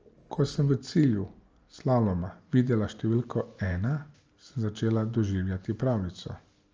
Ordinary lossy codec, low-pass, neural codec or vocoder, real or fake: Opus, 24 kbps; 7.2 kHz; none; real